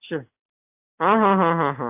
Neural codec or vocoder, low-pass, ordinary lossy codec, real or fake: none; 3.6 kHz; AAC, 32 kbps; real